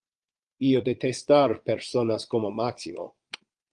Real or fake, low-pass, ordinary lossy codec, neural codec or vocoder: fake; 9.9 kHz; Opus, 24 kbps; vocoder, 22.05 kHz, 80 mel bands, Vocos